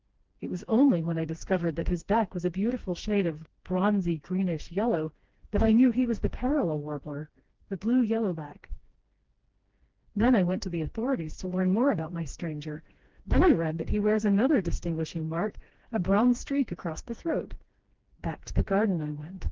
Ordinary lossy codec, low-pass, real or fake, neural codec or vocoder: Opus, 16 kbps; 7.2 kHz; fake; codec, 16 kHz, 2 kbps, FreqCodec, smaller model